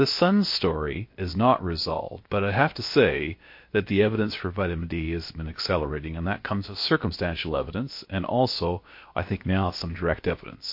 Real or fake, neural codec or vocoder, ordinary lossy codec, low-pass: fake; codec, 16 kHz, about 1 kbps, DyCAST, with the encoder's durations; MP3, 32 kbps; 5.4 kHz